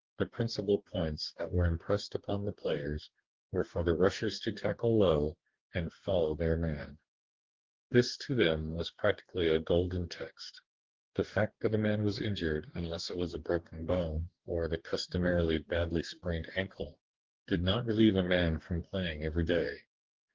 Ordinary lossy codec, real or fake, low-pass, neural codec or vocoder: Opus, 16 kbps; fake; 7.2 kHz; codec, 44.1 kHz, 2.6 kbps, DAC